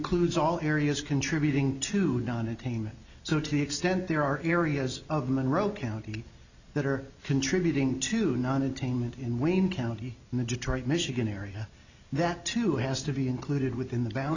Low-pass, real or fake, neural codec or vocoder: 7.2 kHz; real; none